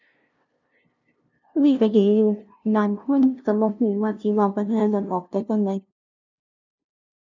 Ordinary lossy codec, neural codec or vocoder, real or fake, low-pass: none; codec, 16 kHz, 0.5 kbps, FunCodec, trained on LibriTTS, 25 frames a second; fake; 7.2 kHz